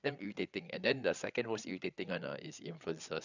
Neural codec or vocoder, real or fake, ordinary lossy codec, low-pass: codec, 16 kHz, 8 kbps, FreqCodec, larger model; fake; none; 7.2 kHz